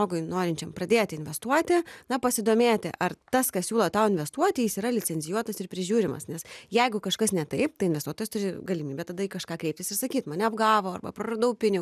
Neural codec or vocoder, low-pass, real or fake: none; 14.4 kHz; real